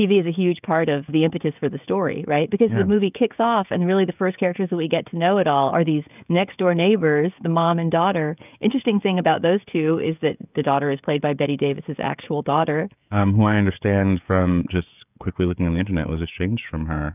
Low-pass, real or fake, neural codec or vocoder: 3.6 kHz; fake; codec, 16 kHz, 16 kbps, FreqCodec, smaller model